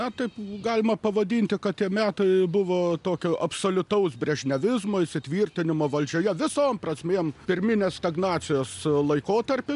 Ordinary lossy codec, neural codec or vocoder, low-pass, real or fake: MP3, 96 kbps; none; 10.8 kHz; real